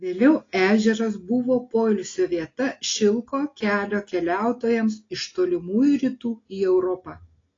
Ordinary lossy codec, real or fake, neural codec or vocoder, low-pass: AAC, 32 kbps; real; none; 7.2 kHz